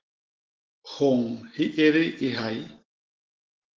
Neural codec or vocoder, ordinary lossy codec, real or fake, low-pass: none; Opus, 16 kbps; real; 7.2 kHz